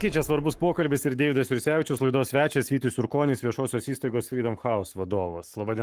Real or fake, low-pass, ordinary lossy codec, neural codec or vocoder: fake; 14.4 kHz; Opus, 24 kbps; codec, 44.1 kHz, 7.8 kbps, Pupu-Codec